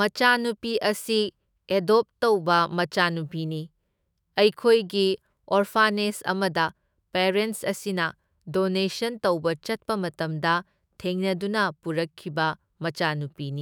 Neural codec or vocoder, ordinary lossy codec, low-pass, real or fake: autoencoder, 48 kHz, 128 numbers a frame, DAC-VAE, trained on Japanese speech; none; none; fake